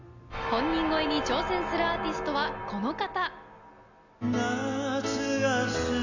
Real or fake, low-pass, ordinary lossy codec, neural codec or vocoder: real; 7.2 kHz; none; none